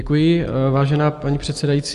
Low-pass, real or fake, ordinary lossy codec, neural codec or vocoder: 10.8 kHz; real; Opus, 32 kbps; none